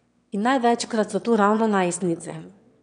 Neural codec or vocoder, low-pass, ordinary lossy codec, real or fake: autoencoder, 22.05 kHz, a latent of 192 numbers a frame, VITS, trained on one speaker; 9.9 kHz; none; fake